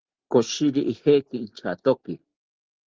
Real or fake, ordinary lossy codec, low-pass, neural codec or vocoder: real; Opus, 16 kbps; 7.2 kHz; none